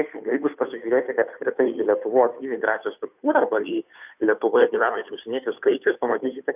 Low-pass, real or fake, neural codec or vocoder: 3.6 kHz; fake; codec, 16 kHz, 2 kbps, FunCodec, trained on Chinese and English, 25 frames a second